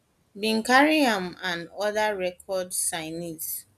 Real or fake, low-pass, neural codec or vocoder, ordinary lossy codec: real; 14.4 kHz; none; none